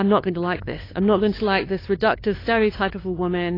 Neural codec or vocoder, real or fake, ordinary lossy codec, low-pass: codec, 16 kHz, 2 kbps, FunCodec, trained on LibriTTS, 25 frames a second; fake; AAC, 24 kbps; 5.4 kHz